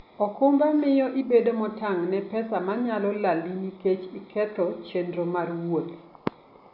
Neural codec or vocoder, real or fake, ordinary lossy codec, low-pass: none; real; none; 5.4 kHz